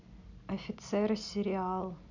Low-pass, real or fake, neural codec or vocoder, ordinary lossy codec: 7.2 kHz; real; none; none